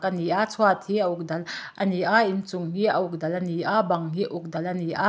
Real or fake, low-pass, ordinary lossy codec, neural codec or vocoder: real; none; none; none